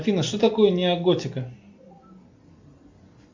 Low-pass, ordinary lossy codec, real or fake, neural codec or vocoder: 7.2 kHz; MP3, 64 kbps; real; none